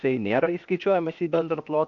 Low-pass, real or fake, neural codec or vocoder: 7.2 kHz; fake; codec, 16 kHz, 0.8 kbps, ZipCodec